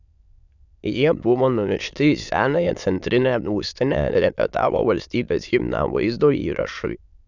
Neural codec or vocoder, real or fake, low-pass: autoencoder, 22.05 kHz, a latent of 192 numbers a frame, VITS, trained on many speakers; fake; 7.2 kHz